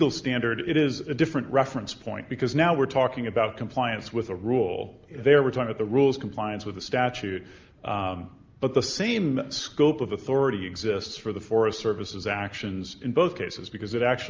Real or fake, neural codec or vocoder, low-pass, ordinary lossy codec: real; none; 7.2 kHz; Opus, 24 kbps